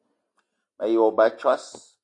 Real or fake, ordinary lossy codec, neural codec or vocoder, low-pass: real; AAC, 48 kbps; none; 10.8 kHz